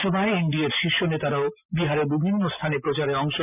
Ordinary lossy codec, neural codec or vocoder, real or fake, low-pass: none; none; real; 3.6 kHz